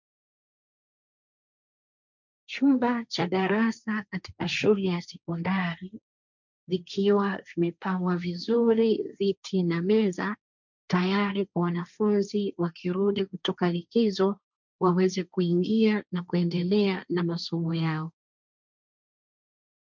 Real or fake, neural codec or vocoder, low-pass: fake; codec, 16 kHz, 1.1 kbps, Voila-Tokenizer; 7.2 kHz